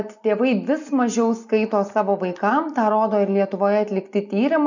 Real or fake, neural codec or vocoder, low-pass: real; none; 7.2 kHz